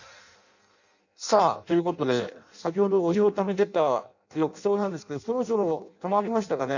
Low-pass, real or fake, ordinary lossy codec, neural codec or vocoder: 7.2 kHz; fake; none; codec, 16 kHz in and 24 kHz out, 0.6 kbps, FireRedTTS-2 codec